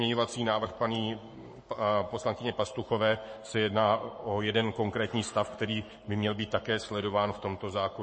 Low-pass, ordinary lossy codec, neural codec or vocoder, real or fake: 9.9 kHz; MP3, 32 kbps; codec, 44.1 kHz, 7.8 kbps, Pupu-Codec; fake